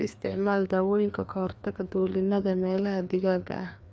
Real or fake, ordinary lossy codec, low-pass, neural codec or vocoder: fake; none; none; codec, 16 kHz, 2 kbps, FreqCodec, larger model